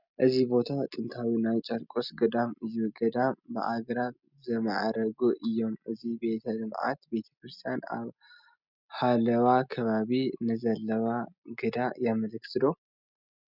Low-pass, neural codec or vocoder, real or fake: 5.4 kHz; none; real